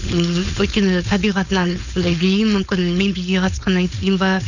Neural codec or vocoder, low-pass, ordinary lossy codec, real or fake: codec, 16 kHz, 4.8 kbps, FACodec; 7.2 kHz; none; fake